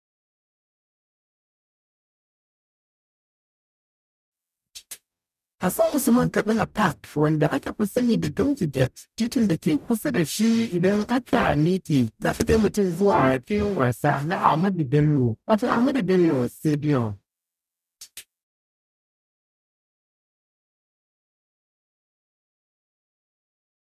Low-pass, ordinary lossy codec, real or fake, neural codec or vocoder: 14.4 kHz; none; fake; codec, 44.1 kHz, 0.9 kbps, DAC